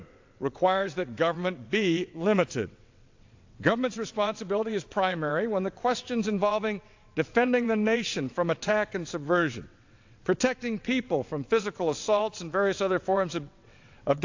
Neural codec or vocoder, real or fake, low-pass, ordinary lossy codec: vocoder, 22.05 kHz, 80 mel bands, WaveNeXt; fake; 7.2 kHz; AAC, 48 kbps